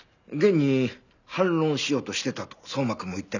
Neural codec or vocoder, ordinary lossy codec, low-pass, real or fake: none; none; 7.2 kHz; real